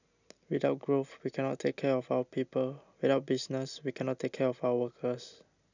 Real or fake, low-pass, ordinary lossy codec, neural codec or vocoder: real; 7.2 kHz; none; none